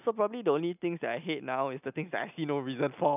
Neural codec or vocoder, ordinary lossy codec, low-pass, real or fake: none; none; 3.6 kHz; real